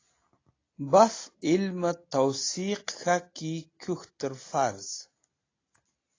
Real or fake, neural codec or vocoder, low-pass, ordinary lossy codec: real; none; 7.2 kHz; AAC, 32 kbps